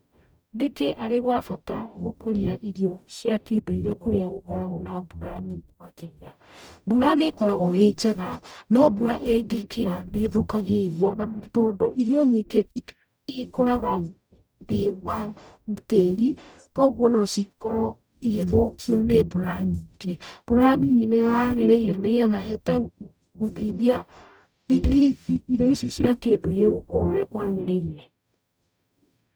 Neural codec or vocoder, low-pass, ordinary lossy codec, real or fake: codec, 44.1 kHz, 0.9 kbps, DAC; none; none; fake